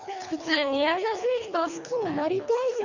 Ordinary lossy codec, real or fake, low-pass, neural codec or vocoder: none; fake; 7.2 kHz; codec, 24 kHz, 3 kbps, HILCodec